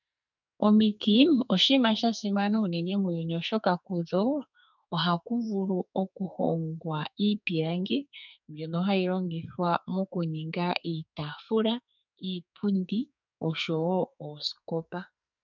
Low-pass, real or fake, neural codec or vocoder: 7.2 kHz; fake; codec, 44.1 kHz, 2.6 kbps, SNAC